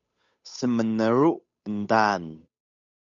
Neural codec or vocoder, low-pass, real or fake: codec, 16 kHz, 8 kbps, FunCodec, trained on Chinese and English, 25 frames a second; 7.2 kHz; fake